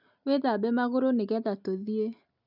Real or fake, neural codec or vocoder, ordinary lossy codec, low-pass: real; none; none; 5.4 kHz